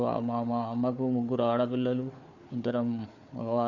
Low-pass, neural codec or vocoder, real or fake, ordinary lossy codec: 7.2 kHz; codec, 16 kHz, 4 kbps, FunCodec, trained on Chinese and English, 50 frames a second; fake; none